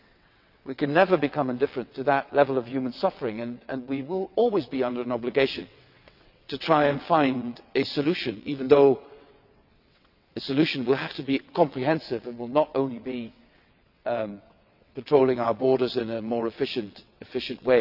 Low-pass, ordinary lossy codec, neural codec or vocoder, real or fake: 5.4 kHz; none; vocoder, 22.05 kHz, 80 mel bands, WaveNeXt; fake